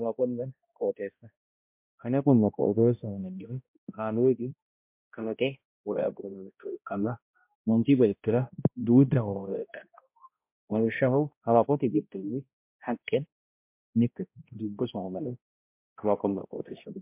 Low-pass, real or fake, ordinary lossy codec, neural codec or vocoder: 3.6 kHz; fake; MP3, 32 kbps; codec, 16 kHz, 0.5 kbps, X-Codec, HuBERT features, trained on balanced general audio